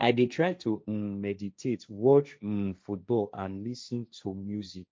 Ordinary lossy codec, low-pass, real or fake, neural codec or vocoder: none; none; fake; codec, 16 kHz, 1.1 kbps, Voila-Tokenizer